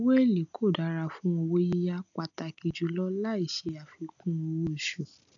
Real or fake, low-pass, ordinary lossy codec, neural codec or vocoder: real; 7.2 kHz; none; none